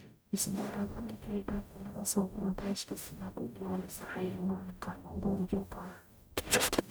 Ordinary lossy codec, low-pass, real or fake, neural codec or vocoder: none; none; fake; codec, 44.1 kHz, 0.9 kbps, DAC